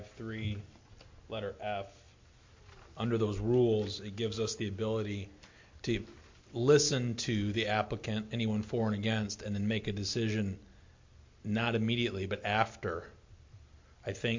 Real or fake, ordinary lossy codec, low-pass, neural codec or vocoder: real; MP3, 48 kbps; 7.2 kHz; none